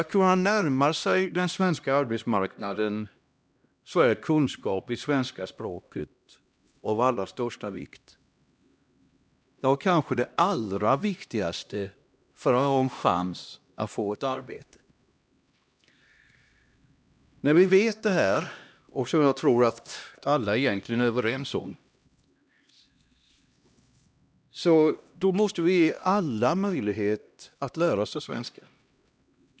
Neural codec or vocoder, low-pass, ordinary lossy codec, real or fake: codec, 16 kHz, 1 kbps, X-Codec, HuBERT features, trained on LibriSpeech; none; none; fake